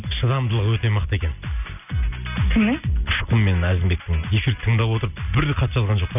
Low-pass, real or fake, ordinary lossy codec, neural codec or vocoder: 3.6 kHz; real; none; none